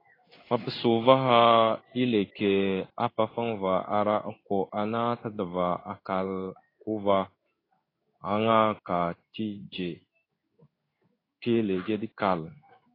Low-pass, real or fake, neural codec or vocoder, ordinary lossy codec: 5.4 kHz; fake; codec, 16 kHz in and 24 kHz out, 1 kbps, XY-Tokenizer; AAC, 24 kbps